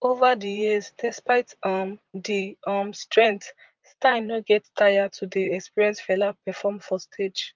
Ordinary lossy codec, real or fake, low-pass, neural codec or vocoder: Opus, 24 kbps; fake; 7.2 kHz; vocoder, 44.1 kHz, 128 mel bands, Pupu-Vocoder